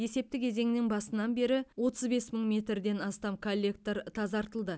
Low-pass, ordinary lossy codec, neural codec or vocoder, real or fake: none; none; none; real